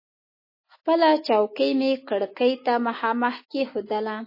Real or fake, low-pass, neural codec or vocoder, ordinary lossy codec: real; 5.4 kHz; none; MP3, 24 kbps